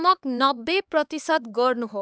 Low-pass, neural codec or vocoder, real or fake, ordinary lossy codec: none; codec, 16 kHz, 4 kbps, X-Codec, HuBERT features, trained on LibriSpeech; fake; none